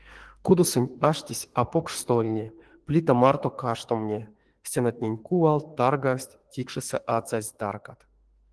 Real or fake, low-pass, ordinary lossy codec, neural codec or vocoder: fake; 10.8 kHz; Opus, 16 kbps; autoencoder, 48 kHz, 32 numbers a frame, DAC-VAE, trained on Japanese speech